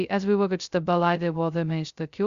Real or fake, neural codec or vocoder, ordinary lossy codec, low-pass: fake; codec, 16 kHz, 0.2 kbps, FocalCodec; Opus, 64 kbps; 7.2 kHz